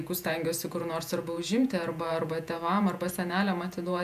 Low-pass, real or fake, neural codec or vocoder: 14.4 kHz; fake; vocoder, 48 kHz, 128 mel bands, Vocos